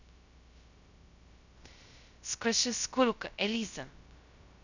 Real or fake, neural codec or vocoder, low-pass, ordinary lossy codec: fake; codec, 16 kHz, 0.2 kbps, FocalCodec; 7.2 kHz; none